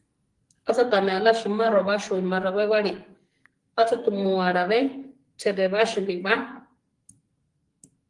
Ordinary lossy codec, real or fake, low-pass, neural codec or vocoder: Opus, 24 kbps; fake; 10.8 kHz; codec, 44.1 kHz, 2.6 kbps, SNAC